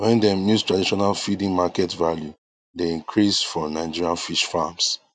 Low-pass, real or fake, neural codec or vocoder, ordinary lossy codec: 9.9 kHz; real; none; none